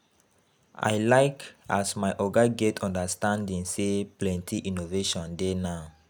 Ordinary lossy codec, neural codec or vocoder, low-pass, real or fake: none; none; none; real